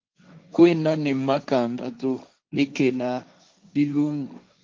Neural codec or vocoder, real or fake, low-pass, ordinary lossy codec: codec, 16 kHz, 1.1 kbps, Voila-Tokenizer; fake; 7.2 kHz; Opus, 24 kbps